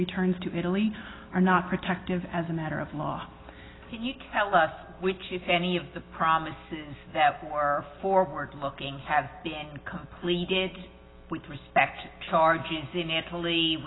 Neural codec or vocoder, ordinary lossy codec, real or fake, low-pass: none; AAC, 16 kbps; real; 7.2 kHz